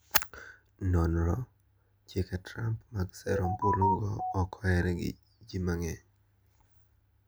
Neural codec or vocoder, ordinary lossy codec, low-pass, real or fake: none; none; none; real